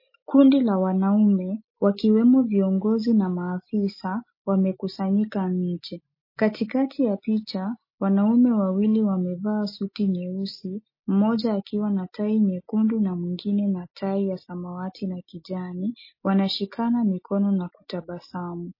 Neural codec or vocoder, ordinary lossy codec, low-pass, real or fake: none; MP3, 24 kbps; 5.4 kHz; real